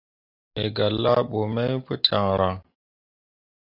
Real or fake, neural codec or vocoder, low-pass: real; none; 5.4 kHz